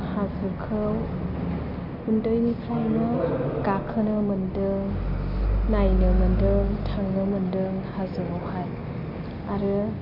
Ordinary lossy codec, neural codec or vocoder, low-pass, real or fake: none; none; 5.4 kHz; real